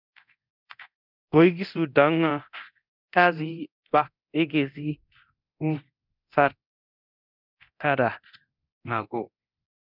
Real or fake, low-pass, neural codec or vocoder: fake; 5.4 kHz; codec, 24 kHz, 0.9 kbps, DualCodec